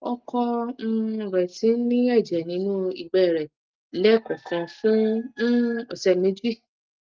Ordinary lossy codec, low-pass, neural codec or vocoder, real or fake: Opus, 32 kbps; 7.2 kHz; none; real